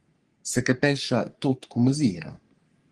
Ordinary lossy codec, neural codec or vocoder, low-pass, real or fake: Opus, 24 kbps; codec, 44.1 kHz, 3.4 kbps, Pupu-Codec; 10.8 kHz; fake